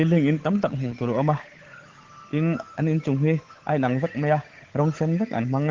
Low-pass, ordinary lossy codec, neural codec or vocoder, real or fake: 7.2 kHz; Opus, 16 kbps; codec, 16 kHz, 8 kbps, FunCodec, trained on Chinese and English, 25 frames a second; fake